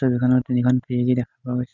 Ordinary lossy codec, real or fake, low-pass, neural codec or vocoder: none; real; 7.2 kHz; none